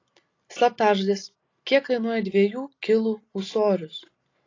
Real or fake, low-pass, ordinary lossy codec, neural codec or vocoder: real; 7.2 kHz; AAC, 32 kbps; none